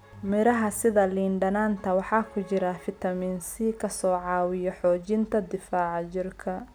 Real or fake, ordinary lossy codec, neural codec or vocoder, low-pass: real; none; none; none